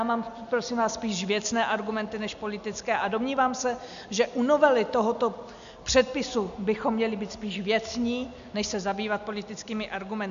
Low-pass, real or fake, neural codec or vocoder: 7.2 kHz; real; none